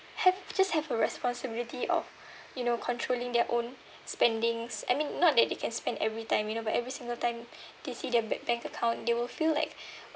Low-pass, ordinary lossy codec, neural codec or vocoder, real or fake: none; none; none; real